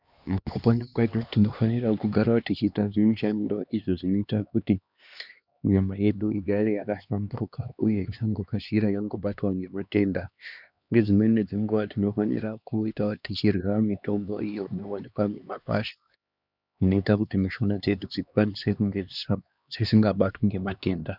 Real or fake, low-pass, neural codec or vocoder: fake; 5.4 kHz; codec, 16 kHz, 2 kbps, X-Codec, HuBERT features, trained on LibriSpeech